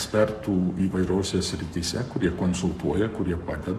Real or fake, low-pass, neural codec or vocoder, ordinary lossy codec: fake; 14.4 kHz; codec, 44.1 kHz, 7.8 kbps, Pupu-Codec; AAC, 96 kbps